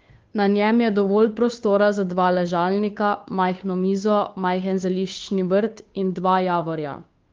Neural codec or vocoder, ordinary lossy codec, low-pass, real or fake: codec, 16 kHz, 2 kbps, FunCodec, trained on Chinese and English, 25 frames a second; Opus, 32 kbps; 7.2 kHz; fake